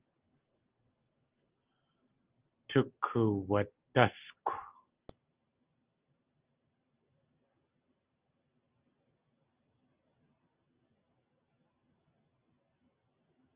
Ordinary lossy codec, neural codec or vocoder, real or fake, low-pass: Opus, 24 kbps; none; real; 3.6 kHz